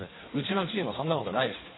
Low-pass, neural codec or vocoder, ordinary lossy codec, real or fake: 7.2 kHz; codec, 16 kHz in and 24 kHz out, 1.1 kbps, FireRedTTS-2 codec; AAC, 16 kbps; fake